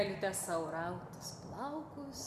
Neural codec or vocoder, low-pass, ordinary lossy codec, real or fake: none; 14.4 kHz; MP3, 96 kbps; real